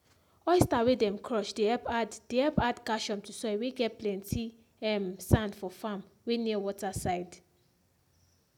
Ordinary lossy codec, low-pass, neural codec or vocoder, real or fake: none; 19.8 kHz; none; real